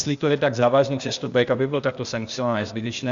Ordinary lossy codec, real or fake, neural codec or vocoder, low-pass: Opus, 64 kbps; fake; codec, 16 kHz, 0.8 kbps, ZipCodec; 7.2 kHz